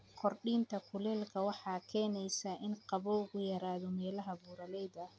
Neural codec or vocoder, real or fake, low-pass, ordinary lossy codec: none; real; none; none